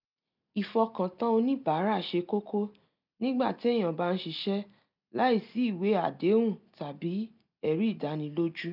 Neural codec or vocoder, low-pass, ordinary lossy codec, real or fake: none; 5.4 kHz; none; real